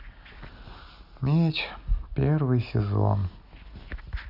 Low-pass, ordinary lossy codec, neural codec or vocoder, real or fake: 5.4 kHz; none; none; real